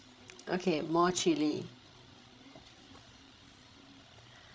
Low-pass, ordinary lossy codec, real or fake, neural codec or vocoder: none; none; fake; codec, 16 kHz, 16 kbps, FreqCodec, larger model